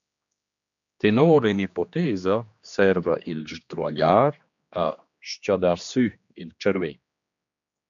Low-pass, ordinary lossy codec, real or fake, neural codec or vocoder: 7.2 kHz; MP3, 64 kbps; fake; codec, 16 kHz, 2 kbps, X-Codec, HuBERT features, trained on general audio